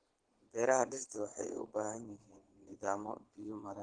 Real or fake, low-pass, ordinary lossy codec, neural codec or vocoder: fake; 9.9 kHz; Opus, 16 kbps; vocoder, 22.05 kHz, 80 mel bands, Vocos